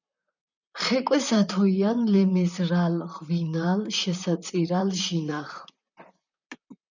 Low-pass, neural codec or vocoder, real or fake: 7.2 kHz; vocoder, 44.1 kHz, 128 mel bands, Pupu-Vocoder; fake